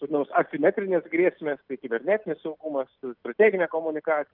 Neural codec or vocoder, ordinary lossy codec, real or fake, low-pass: none; Opus, 32 kbps; real; 5.4 kHz